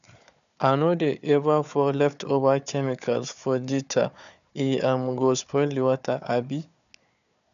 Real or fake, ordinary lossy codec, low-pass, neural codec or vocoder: fake; none; 7.2 kHz; codec, 16 kHz, 4 kbps, FunCodec, trained on Chinese and English, 50 frames a second